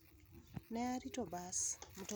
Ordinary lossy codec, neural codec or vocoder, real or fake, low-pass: none; none; real; none